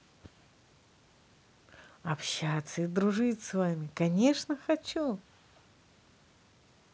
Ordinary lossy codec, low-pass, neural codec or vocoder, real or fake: none; none; none; real